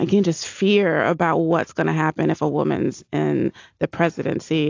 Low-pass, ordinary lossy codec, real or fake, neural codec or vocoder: 7.2 kHz; AAC, 48 kbps; real; none